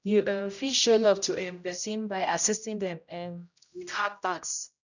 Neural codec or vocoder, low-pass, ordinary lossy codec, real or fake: codec, 16 kHz, 0.5 kbps, X-Codec, HuBERT features, trained on general audio; 7.2 kHz; none; fake